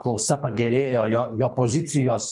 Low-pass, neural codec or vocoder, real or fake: 10.8 kHz; codec, 24 kHz, 3 kbps, HILCodec; fake